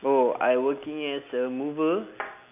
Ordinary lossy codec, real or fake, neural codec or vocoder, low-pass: none; real; none; 3.6 kHz